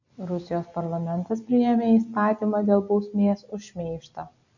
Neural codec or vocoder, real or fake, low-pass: none; real; 7.2 kHz